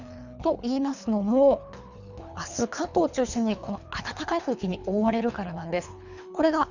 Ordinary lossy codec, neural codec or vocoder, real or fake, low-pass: none; codec, 24 kHz, 3 kbps, HILCodec; fake; 7.2 kHz